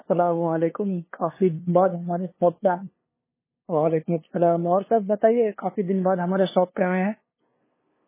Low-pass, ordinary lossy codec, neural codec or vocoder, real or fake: 3.6 kHz; MP3, 16 kbps; codec, 16 kHz, 2 kbps, FunCodec, trained on LibriTTS, 25 frames a second; fake